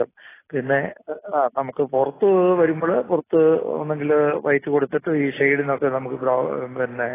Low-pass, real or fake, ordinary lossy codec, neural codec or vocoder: 3.6 kHz; real; AAC, 16 kbps; none